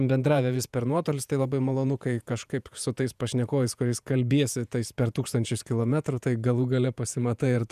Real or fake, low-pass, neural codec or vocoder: fake; 14.4 kHz; vocoder, 48 kHz, 128 mel bands, Vocos